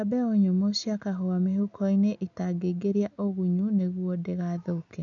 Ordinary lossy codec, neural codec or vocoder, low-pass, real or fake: none; none; 7.2 kHz; real